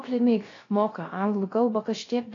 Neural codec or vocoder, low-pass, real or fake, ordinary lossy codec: codec, 16 kHz, 0.3 kbps, FocalCodec; 7.2 kHz; fake; AAC, 32 kbps